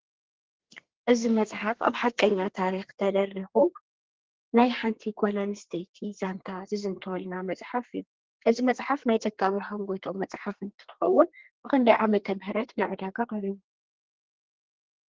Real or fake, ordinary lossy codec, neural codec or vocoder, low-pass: fake; Opus, 16 kbps; codec, 32 kHz, 1.9 kbps, SNAC; 7.2 kHz